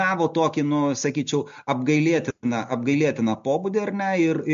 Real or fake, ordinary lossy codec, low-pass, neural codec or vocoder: real; MP3, 48 kbps; 7.2 kHz; none